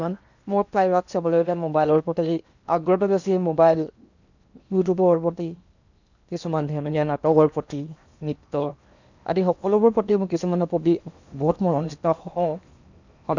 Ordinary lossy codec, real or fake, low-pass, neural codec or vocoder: none; fake; 7.2 kHz; codec, 16 kHz in and 24 kHz out, 0.8 kbps, FocalCodec, streaming, 65536 codes